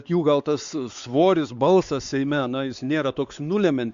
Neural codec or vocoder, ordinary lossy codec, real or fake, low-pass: codec, 16 kHz, 4 kbps, X-Codec, WavLM features, trained on Multilingual LibriSpeech; Opus, 64 kbps; fake; 7.2 kHz